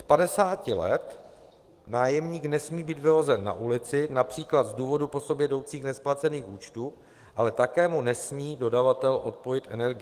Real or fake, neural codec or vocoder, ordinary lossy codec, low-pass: fake; codec, 44.1 kHz, 7.8 kbps, DAC; Opus, 24 kbps; 14.4 kHz